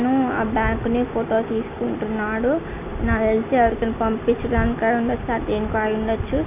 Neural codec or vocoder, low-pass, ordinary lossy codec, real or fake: none; 3.6 kHz; none; real